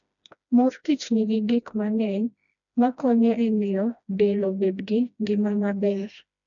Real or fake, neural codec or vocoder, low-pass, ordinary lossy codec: fake; codec, 16 kHz, 1 kbps, FreqCodec, smaller model; 7.2 kHz; none